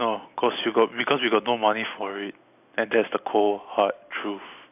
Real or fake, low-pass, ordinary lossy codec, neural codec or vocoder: real; 3.6 kHz; none; none